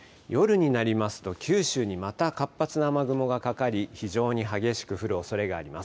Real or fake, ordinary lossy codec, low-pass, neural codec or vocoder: real; none; none; none